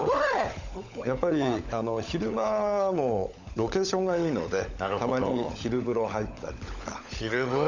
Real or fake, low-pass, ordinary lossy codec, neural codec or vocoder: fake; 7.2 kHz; none; codec, 16 kHz, 16 kbps, FunCodec, trained on LibriTTS, 50 frames a second